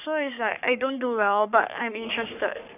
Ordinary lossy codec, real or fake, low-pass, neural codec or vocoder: none; fake; 3.6 kHz; codec, 44.1 kHz, 3.4 kbps, Pupu-Codec